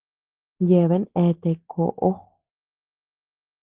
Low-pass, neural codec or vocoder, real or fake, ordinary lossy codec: 3.6 kHz; none; real; Opus, 16 kbps